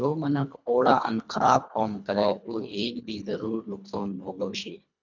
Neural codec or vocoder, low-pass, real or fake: codec, 24 kHz, 1.5 kbps, HILCodec; 7.2 kHz; fake